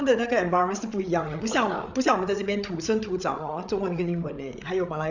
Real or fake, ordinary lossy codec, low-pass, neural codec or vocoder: fake; none; 7.2 kHz; codec, 16 kHz, 8 kbps, FreqCodec, larger model